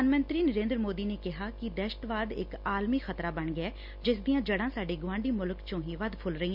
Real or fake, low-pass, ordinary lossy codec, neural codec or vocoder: real; 5.4 kHz; Opus, 64 kbps; none